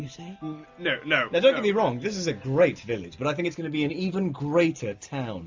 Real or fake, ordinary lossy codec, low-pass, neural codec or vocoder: real; MP3, 64 kbps; 7.2 kHz; none